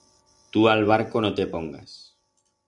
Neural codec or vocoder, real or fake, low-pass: none; real; 10.8 kHz